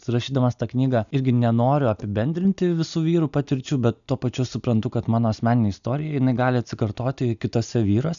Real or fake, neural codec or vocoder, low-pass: real; none; 7.2 kHz